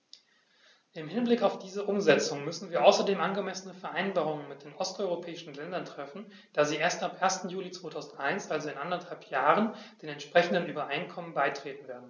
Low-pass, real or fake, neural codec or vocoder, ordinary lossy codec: 7.2 kHz; real; none; none